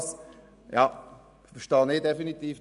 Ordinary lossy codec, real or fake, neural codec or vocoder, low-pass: none; real; none; 10.8 kHz